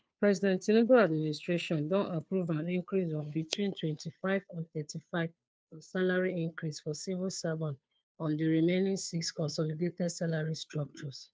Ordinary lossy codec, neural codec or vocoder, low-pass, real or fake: none; codec, 16 kHz, 2 kbps, FunCodec, trained on Chinese and English, 25 frames a second; none; fake